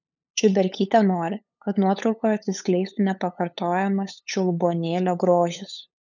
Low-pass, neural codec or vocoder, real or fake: 7.2 kHz; codec, 16 kHz, 8 kbps, FunCodec, trained on LibriTTS, 25 frames a second; fake